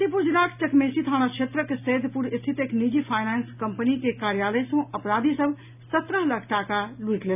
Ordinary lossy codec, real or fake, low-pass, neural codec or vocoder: none; real; 3.6 kHz; none